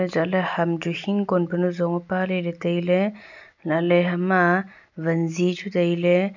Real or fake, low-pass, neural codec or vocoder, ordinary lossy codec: real; 7.2 kHz; none; none